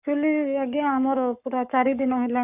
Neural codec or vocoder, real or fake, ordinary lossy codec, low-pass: codec, 44.1 kHz, 7.8 kbps, Pupu-Codec; fake; none; 3.6 kHz